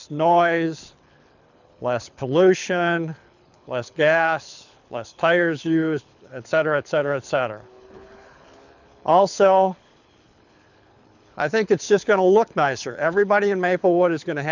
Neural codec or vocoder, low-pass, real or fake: codec, 24 kHz, 6 kbps, HILCodec; 7.2 kHz; fake